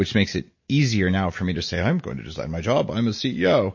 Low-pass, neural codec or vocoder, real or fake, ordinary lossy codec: 7.2 kHz; none; real; MP3, 32 kbps